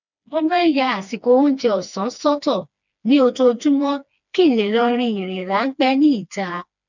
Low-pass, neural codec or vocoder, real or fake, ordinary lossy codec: 7.2 kHz; codec, 16 kHz, 2 kbps, FreqCodec, smaller model; fake; none